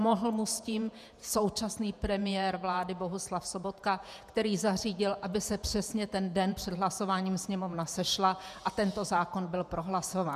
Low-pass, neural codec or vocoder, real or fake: 14.4 kHz; vocoder, 48 kHz, 128 mel bands, Vocos; fake